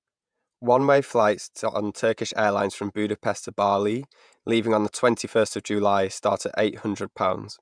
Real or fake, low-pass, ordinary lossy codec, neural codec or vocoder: fake; 9.9 kHz; MP3, 96 kbps; vocoder, 48 kHz, 128 mel bands, Vocos